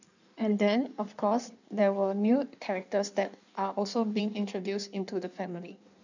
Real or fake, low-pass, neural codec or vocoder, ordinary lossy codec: fake; 7.2 kHz; codec, 16 kHz in and 24 kHz out, 1.1 kbps, FireRedTTS-2 codec; none